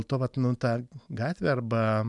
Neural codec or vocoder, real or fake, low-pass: none; real; 10.8 kHz